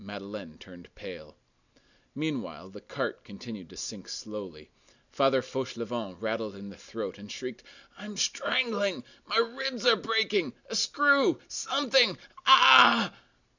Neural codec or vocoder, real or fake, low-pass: none; real; 7.2 kHz